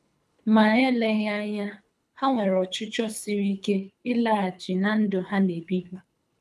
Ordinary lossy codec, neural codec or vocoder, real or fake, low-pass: none; codec, 24 kHz, 3 kbps, HILCodec; fake; none